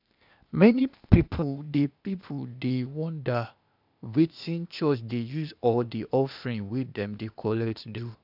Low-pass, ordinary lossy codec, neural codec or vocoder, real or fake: 5.4 kHz; none; codec, 16 kHz, 0.8 kbps, ZipCodec; fake